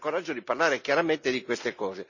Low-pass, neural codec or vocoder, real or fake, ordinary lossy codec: 7.2 kHz; none; real; none